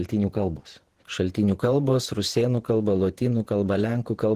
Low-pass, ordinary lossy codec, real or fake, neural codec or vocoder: 14.4 kHz; Opus, 16 kbps; fake; vocoder, 48 kHz, 128 mel bands, Vocos